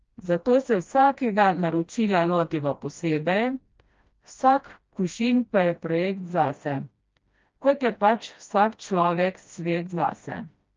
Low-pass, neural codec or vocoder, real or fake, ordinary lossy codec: 7.2 kHz; codec, 16 kHz, 1 kbps, FreqCodec, smaller model; fake; Opus, 24 kbps